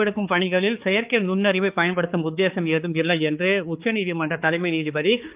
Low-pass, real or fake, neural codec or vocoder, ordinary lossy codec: 3.6 kHz; fake; codec, 16 kHz, 4 kbps, X-Codec, HuBERT features, trained on balanced general audio; Opus, 32 kbps